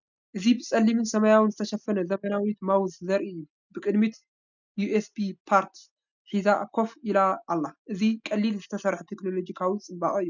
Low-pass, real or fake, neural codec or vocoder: 7.2 kHz; real; none